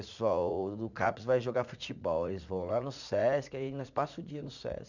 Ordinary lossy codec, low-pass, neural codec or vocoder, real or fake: none; 7.2 kHz; vocoder, 44.1 kHz, 80 mel bands, Vocos; fake